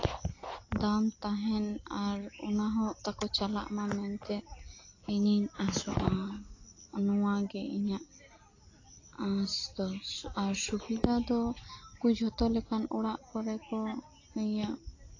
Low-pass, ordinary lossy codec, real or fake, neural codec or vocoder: 7.2 kHz; AAC, 32 kbps; real; none